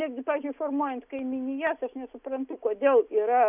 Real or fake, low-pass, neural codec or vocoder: real; 3.6 kHz; none